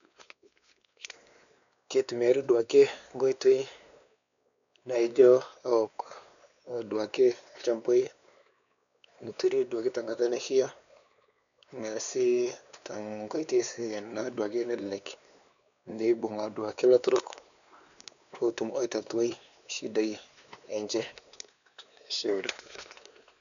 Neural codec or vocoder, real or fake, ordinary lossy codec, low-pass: codec, 16 kHz, 2 kbps, X-Codec, WavLM features, trained on Multilingual LibriSpeech; fake; none; 7.2 kHz